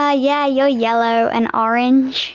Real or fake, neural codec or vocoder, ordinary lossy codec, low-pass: real; none; Opus, 24 kbps; 7.2 kHz